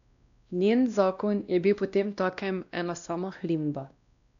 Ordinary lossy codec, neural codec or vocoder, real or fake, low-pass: none; codec, 16 kHz, 1 kbps, X-Codec, WavLM features, trained on Multilingual LibriSpeech; fake; 7.2 kHz